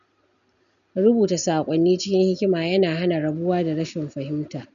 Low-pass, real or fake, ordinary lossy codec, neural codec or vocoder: 7.2 kHz; real; none; none